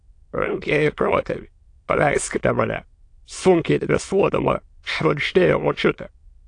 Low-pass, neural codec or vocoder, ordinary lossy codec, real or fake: 9.9 kHz; autoencoder, 22.05 kHz, a latent of 192 numbers a frame, VITS, trained on many speakers; AAC, 48 kbps; fake